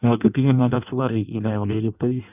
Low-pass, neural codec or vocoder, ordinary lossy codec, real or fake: 3.6 kHz; codec, 16 kHz in and 24 kHz out, 0.6 kbps, FireRedTTS-2 codec; none; fake